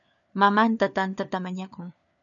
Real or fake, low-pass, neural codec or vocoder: fake; 7.2 kHz; codec, 16 kHz, 4 kbps, FunCodec, trained on LibriTTS, 50 frames a second